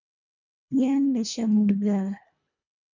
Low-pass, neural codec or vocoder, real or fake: 7.2 kHz; codec, 24 kHz, 1.5 kbps, HILCodec; fake